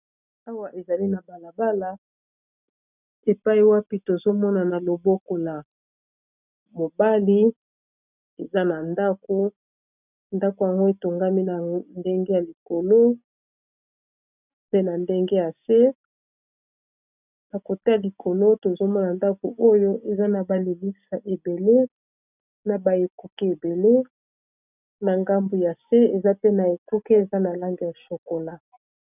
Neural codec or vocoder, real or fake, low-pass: none; real; 3.6 kHz